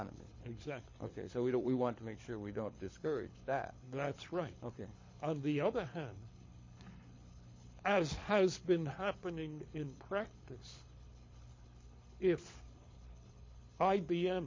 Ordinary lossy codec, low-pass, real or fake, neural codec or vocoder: MP3, 32 kbps; 7.2 kHz; fake; codec, 24 kHz, 6 kbps, HILCodec